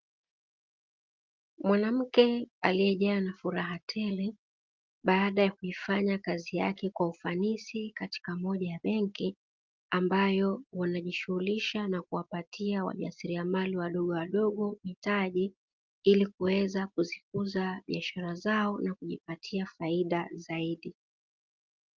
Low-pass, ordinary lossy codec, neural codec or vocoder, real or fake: 7.2 kHz; Opus, 32 kbps; none; real